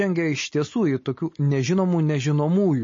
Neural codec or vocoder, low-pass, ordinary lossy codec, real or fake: none; 7.2 kHz; MP3, 32 kbps; real